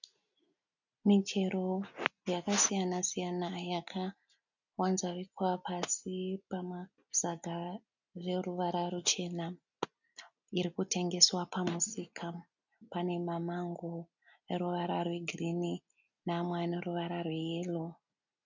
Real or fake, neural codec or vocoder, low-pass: real; none; 7.2 kHz